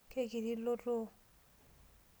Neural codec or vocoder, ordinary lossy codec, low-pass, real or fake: none; none; none; real